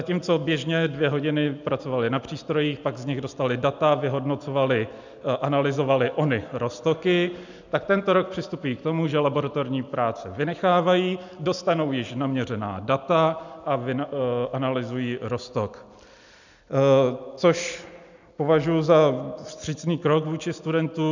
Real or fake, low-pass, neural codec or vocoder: real; 7.2 kHz; none